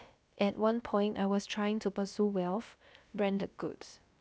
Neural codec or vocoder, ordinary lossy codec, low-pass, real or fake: codec, 16 kHz, about 1 kbps, DyCAST, with the encoder's durations; none; none; fake